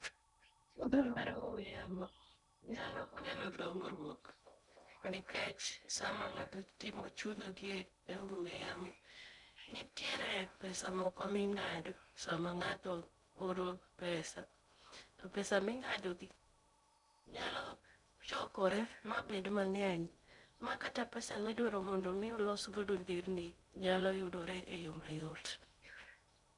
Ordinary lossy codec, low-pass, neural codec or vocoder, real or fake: none; 10.8 kHz; codec, 16 kHz in and 24 kHz out, 0.6 kbps, FocalCodec, streaming, 2048 codes; fake